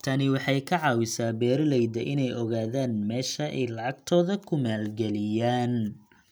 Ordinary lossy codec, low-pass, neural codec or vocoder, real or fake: none; none; none; real